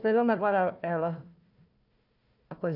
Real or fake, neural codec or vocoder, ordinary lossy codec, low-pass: fake; codec, 16 kHz, 1 kbps, FunCodec, trained on Chinese and English, 50 frames a second; none; 5.4 kHz